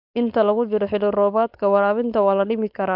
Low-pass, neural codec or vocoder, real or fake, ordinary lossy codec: 5.4 kHz; codec, 16 kHz, 4.8 kbps, FACodec; fake; AAC, 48 kbps